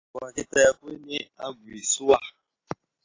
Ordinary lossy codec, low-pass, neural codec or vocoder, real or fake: AAC, 32 kbps; 7.2 kHz; none; real